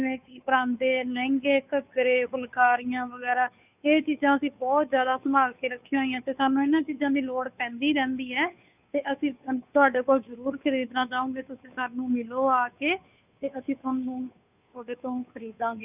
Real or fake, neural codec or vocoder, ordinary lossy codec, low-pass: fake; codec, 16 kHz, 2 kbps, FunCodec, trained on Chinese and English, 25 frames a second; none; 3.6 kHz